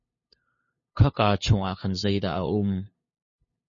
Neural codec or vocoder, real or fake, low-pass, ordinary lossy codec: codec, 16 kHz, 2 kbps, FunCodec, trained on LibriTTS, 25 frames a second; fake; 7.2 kHz; MP3, 32 kbps